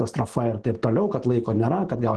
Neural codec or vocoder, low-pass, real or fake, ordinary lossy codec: none; 10.8 kHz; real; Opus, 16 kbps